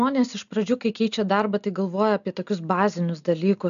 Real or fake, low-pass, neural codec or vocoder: real; 7.2 kHz; none